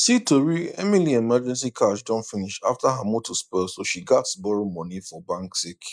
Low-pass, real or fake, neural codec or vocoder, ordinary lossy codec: none; real; none; none